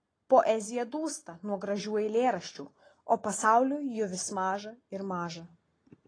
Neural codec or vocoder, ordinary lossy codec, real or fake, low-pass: none; AAC, 32 kbps; real; 9.9 kHz